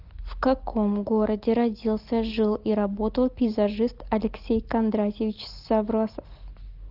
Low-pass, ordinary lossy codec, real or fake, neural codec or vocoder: 5.4 kHz; Opus, 32 kbps; real; none